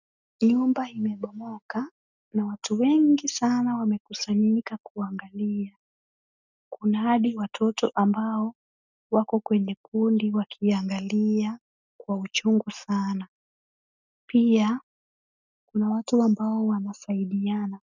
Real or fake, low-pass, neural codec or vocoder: real; 7.2 kHz; none